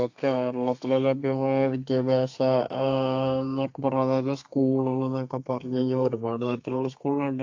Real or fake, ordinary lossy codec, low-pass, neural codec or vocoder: fake; AAC, 48 kbps; 7.2 kHz; codec, 32 kHz, 1.9 kbps, SNAC